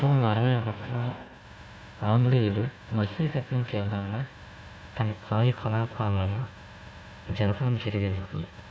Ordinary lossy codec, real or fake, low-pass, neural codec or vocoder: none; fake; none; codec, 16 kHz, 1 kbps, FunCodec, trained on Chinese and English, 50 frames a second